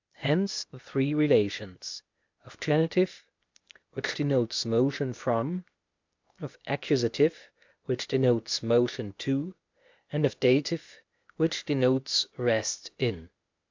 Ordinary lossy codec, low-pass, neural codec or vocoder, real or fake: MP3, 64 kbps; 7.2 kHz; codec, 16 kHz, 0.8 kbps, ZipCodec; fake